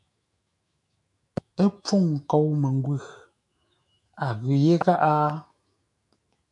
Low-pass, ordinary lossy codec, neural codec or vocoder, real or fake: 10.8 kHz; MP3, 96 kbps; autoencoder, 48 kHz, 128 numbers a frame, DAC-VAE, trained on Japanese speech; fake